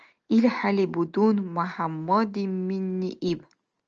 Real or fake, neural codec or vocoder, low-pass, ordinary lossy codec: real; none; 7.2 kHz; Opus, 24 kbps